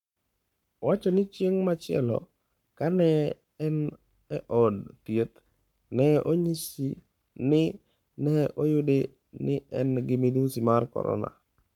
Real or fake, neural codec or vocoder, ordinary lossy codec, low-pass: fake; codec, 44.1 kHz, 7.8 kbps, Pupu-Codec; none; 19.8 kHz